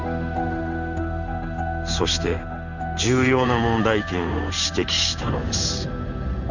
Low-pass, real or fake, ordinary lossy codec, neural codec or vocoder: 7.2 kHz; fake; none; codec, 16 kHz in and 24 kHz out, 1 kbps, XY-Tokenizer